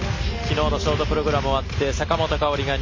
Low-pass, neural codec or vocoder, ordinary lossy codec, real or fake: 7.2 kHz; none; MP3, 32 kbps; real